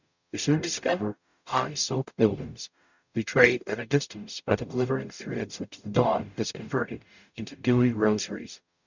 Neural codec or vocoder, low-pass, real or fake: codec, 44.1 kHz, 0.9 kbps, DAC; 7.2 kHz; fake